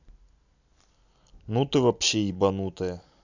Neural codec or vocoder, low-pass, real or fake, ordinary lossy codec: none; 7.2 kHz; real; none